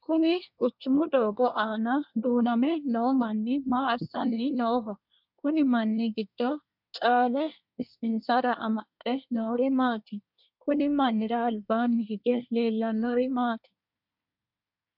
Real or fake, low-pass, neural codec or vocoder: fake; 5.4 kHz; codec, 24 kHz, 1 kbps, SNAC